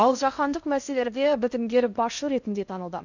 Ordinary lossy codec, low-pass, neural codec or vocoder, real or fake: none; 7.2 kHz; codec, 16 kHz in and 24 kHz out, 0.6 kbps, FocalCodec, streaming, 2048 codes; fake